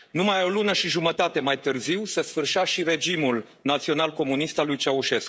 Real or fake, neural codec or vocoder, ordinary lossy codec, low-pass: fake; codec, 16 kHz, 16 kbps, FreqCodec, smaller model; none; none